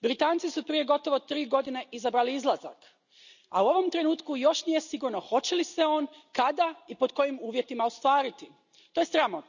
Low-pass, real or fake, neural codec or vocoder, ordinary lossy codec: 7.2 kHz; real; none; none